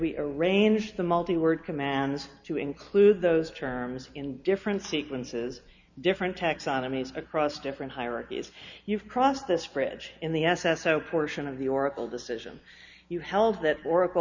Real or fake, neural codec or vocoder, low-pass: real; none; 7.2 kHz